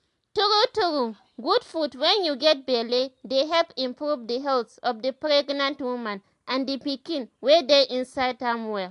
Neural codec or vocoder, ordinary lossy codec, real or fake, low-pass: none; AAC, 64 kbps; real; 10.8 kHz